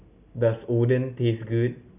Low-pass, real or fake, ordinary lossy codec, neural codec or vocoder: 3.6 kHz; real; none; none